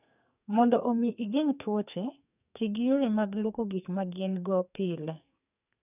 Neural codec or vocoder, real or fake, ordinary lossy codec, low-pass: codec, 44.1 kHz, 2.6 kbps, SNAC; fake; none; 3.6 kHz